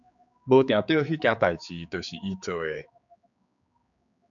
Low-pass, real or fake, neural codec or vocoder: 7.2 kHz; fake; codec, 16 kHz, 4 kbps, X-Codec, HuBERT features, trained on general audio